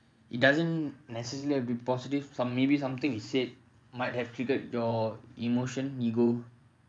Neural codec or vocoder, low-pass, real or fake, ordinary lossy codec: vocoder, 24 kHz, 100 mel bands, Vocos; 9.9 kHz; fake; none